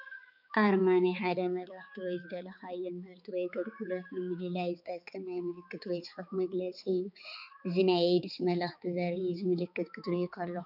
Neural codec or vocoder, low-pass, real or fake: codec, 16 kHz, 4 kbps, X-Codec, HuBERT features, trained on balanced general audio; 5.4 kHz; fake